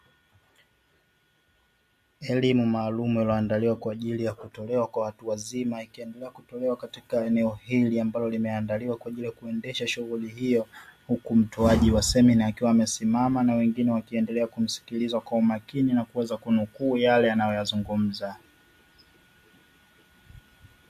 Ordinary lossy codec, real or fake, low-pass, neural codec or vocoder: MP3, 64 kbps; real; 14.4 kHz; none